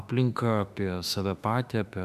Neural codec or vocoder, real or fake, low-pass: autoencoder, 48 kHz, 32 numbers a frame, DAC-VAE, trained on Japanese speech; fake; 14.4 kHz